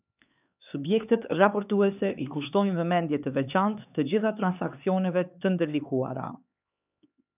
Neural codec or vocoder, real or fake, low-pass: codec, 16 kHz, 4 kbps, X-Codec, HuBERT features, trained on LibriSpeech; fake; 3.6 kHz